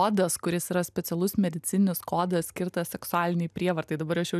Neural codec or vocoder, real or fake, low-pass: none; real; 14.4 kHz